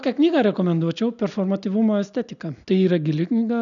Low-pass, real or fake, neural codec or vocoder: 7.2 kHz; real; none